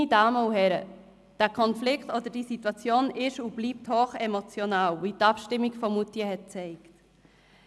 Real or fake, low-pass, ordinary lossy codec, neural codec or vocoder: real; none; none; none